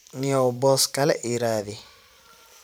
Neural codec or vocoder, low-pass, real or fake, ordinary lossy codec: vocoder, 44.1 kHz, 128 mel bands every 512 samples, BigVGAN v2; none; fake; none